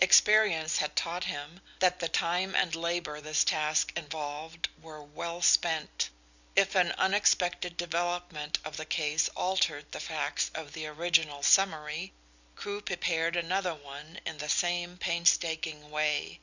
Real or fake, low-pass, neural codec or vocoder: real; 7.2 kHz; none